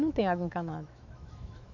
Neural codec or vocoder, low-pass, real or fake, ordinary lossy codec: autoencoder, 48 kHz, 128 numbers a frame, DAC-VAE, trained on Japanese speech; 7.2 kHz; fake; none